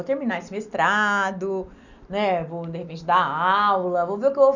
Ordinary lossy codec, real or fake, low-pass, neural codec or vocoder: none; real; 7.2 kHz; none